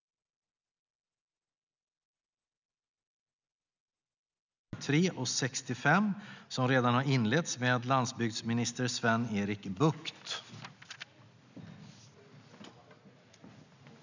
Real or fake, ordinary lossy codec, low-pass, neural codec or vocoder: real; none; 7.2 kHz; none